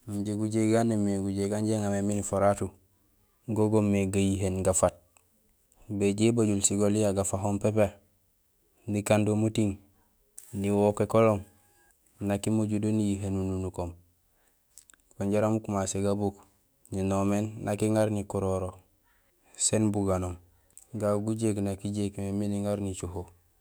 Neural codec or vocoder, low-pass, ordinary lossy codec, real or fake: autoencoder, 48 kHz, 128 numbers a frame, DAC-VAE, trained on Japanese speech; none; none; fake